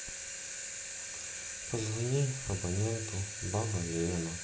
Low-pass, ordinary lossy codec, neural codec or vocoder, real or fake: none; none; none; real